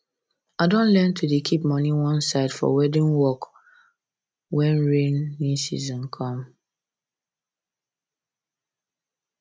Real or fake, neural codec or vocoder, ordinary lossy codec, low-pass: real; none; none; none